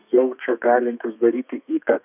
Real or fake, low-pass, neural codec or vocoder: fake; 3.6 kHz; codec, 32 kHz, 1.9 kbps, SNAC